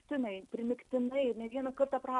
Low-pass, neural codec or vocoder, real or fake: 10.8 kHz; none; real